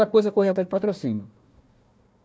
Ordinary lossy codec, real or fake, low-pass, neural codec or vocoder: none; fake; none; codec, 16 kHz, 1 kbps, FunCodec, trained on Chinese and English, 50 frames a second